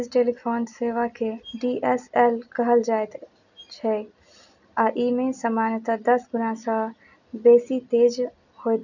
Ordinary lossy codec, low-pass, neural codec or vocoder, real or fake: none; 7.2 kHz; none; real